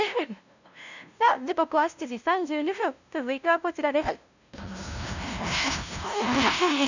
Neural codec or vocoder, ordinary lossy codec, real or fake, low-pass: codec, 16 kHz, 0.5 kbps, FunCodec, trained on LibriTTS, 25 frames a second; none; fake; 7.2 kHz